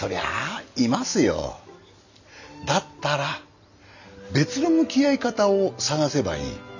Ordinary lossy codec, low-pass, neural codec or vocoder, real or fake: none; 7.2 kHz; none; real